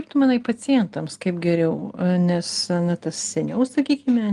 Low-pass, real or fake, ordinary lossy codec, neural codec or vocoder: 10.8 kHz; real; Opus, 24 kbps; none